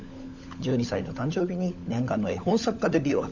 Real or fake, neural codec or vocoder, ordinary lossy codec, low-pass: fake; codec, 16 kHz, 16 kbps, FunCodec, trained on LibriTTS, 50 frames a second; none; 7.2 kHz